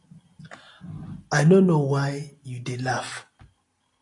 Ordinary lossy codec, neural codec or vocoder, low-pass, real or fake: AAC, 48 kbps; none; 10.8 kHz; real